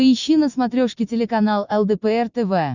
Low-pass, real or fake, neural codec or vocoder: 7.2 kHz; real; none